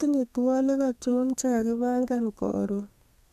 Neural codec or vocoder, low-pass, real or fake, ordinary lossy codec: codec, 32 kHz, 1.9 kbps, SNAC; 14.4 kHz; fake; none